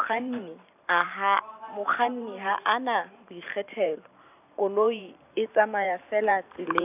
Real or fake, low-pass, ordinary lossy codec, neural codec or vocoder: fake; 3.6 kHz; none; vocoder, 44.1 kHz, 128 mel bands every 512 samples, BigVGAN v2